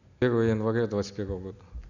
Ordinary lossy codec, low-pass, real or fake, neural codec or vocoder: none; 7.2 kHz; real; none